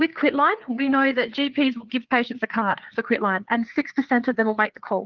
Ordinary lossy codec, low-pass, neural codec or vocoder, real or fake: Opus, 32 kbps; 7.2 kHz; codec, 16 kHz, 4 kbps, FreqCodec, larger model; fake